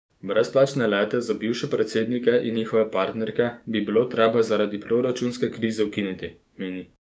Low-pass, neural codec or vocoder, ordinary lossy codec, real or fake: none; codec, 16 kHz, 6 kbps, DAC; none; fake